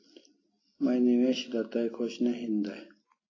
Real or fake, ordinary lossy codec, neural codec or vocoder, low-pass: real; AAC, 32 kbps; none; 7.2 kHz